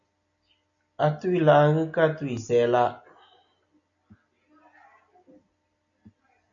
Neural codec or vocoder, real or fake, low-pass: none; real; 7.2 kHz